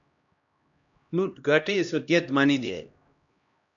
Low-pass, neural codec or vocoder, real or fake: 7.2 kHz; codec, 16 kHz, 1 kbps, X-Codec, HuBERT features, trained on LibriSpeech; fake